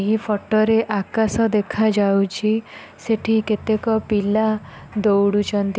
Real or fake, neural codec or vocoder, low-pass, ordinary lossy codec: real; none; none; none